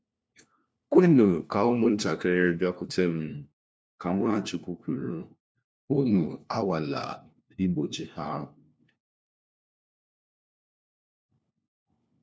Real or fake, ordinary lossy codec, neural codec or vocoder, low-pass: fake; none; codec, 16 kHz, 1 kbps, FunCodec, trained on LibriTTS, 50 frames a second; none